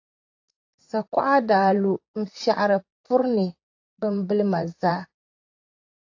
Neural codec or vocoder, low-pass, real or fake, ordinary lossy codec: vocoder, 44.1 kHz, 128 mel bands, Pupu-Vocoder; 7.2 kHz; fake; AAC, 48 kbps